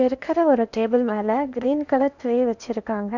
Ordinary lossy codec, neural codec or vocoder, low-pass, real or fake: none; codec, 16 kHz in and 24 kHz out, 0.8 kbps, FocalCodec, streaming, 65536 codes; 7.2 kHz; fake